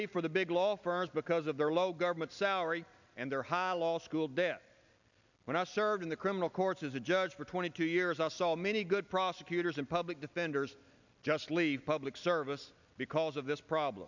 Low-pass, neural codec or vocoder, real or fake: 7.2 kHz; none; real